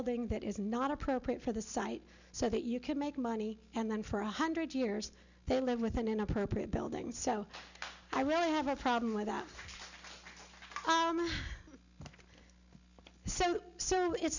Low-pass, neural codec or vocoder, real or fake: 7.2 kHz; none; real